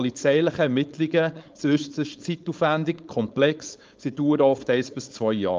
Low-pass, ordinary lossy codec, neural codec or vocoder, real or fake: 7.2 kHz; Opus, 24 kbps; codec, 16 kHz, 4.8 kbps, FACodec; fake